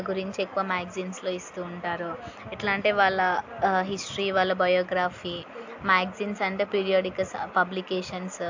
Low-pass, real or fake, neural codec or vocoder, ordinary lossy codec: 7.2 kHz; real; none; AAC, 48 kbps